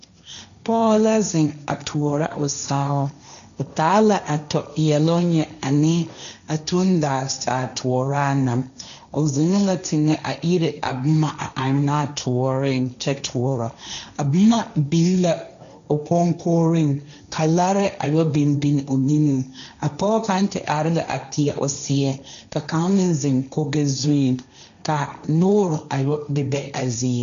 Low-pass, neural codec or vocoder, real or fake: 7.2 kHz; codec, 16 kHz, 1.1 kbps, Voila-Tokenizer; fake